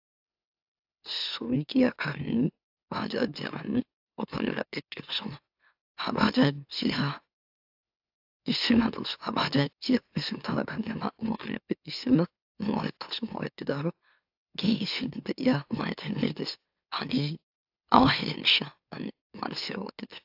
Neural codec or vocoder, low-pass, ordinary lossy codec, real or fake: autoencoder, 44.1 kHz, a latent of 192 numbers a frame, MeloTTS; 5.4 kHz; AAC, 48 kbps; fake